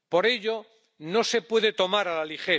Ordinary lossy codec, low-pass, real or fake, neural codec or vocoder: none; none; real; none